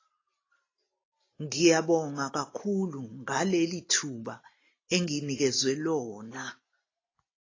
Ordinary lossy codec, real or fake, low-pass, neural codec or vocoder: AAC, 32 kbps; real; 7.2 kHz; none